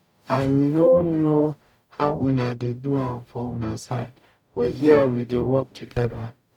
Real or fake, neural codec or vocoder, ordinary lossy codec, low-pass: fake; codec, 44.1 kHz, 0.9 kbps, DAC; none; 19.8 kHz